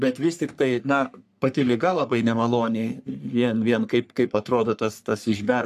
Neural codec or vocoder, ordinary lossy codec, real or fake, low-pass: codec, 44.1 kHz, 3.4 kbps, Pupu-Codec; AAC, 96 kbps; fake; 14.4 kHz